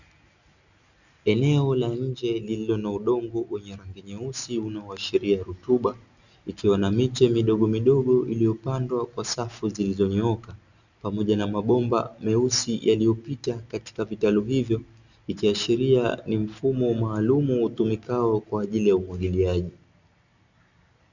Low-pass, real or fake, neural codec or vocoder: 7.2 kHz; real; none